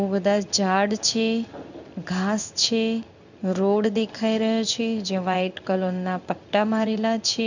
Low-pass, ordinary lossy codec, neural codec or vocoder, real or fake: 7.2 kHz; none; codec, 16 kHz in and 24 kHz out, 1 kbps, XY-Tokenizer; fake